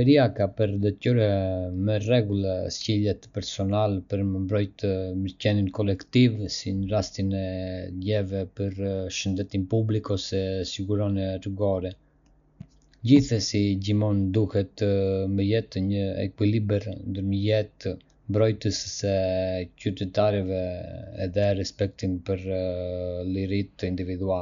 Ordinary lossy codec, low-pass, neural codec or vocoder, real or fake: none; 7.2 kHz; none; real